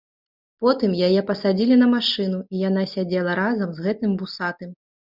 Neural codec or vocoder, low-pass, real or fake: none; 5.4 kHz; real